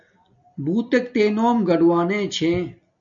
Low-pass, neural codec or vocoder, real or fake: 7.2 kHz; none; real